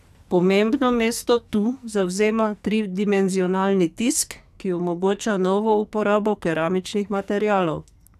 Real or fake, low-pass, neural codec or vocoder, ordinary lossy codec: fake; 14.4 kHz; codec, 44.1 kHz, 2.6 kbps, SNAC; none